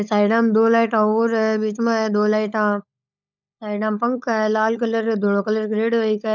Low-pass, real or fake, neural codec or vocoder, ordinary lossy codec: 7.2 kHz; fake; codec, 16 kHz, 16 kbps, FunCodec, trained on LibriTTS, 50 frames a second; none